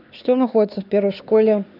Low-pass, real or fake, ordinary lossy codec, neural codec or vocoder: 5.4 kHz; fake; none; codec, 16 kHz, 2 kbps, X-Codec, HuBERT features, trained on LibriSpeech